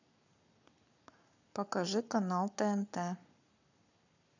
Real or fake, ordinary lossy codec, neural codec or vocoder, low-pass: fake; none; codec, 44.1 kHz, 7.8 kbps, Pupu-Codec; 7.2 kHz